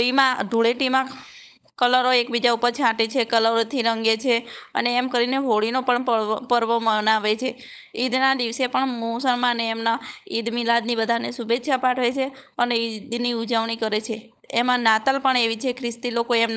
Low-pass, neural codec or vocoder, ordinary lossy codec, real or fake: none; codec, 16 kHz, 16 kbps, FunCodec, trained on LibriTTS, 50 frames a second; none; fake